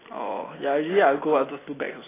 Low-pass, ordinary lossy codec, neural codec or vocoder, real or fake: 3.6 kHz; AAC, 16 kbps; none; real